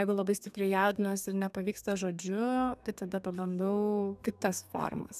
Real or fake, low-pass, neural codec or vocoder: fake; 14.4 kHz; codec, 32 kHz, 1.9 kbps, SNAC